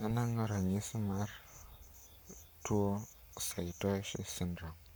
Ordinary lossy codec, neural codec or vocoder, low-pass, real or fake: none; codec, 44.1 kHz, 7.8 kbps, Pupu-Codec; none; fake